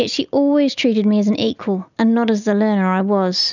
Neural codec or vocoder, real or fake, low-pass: none; real; 7.2 kHz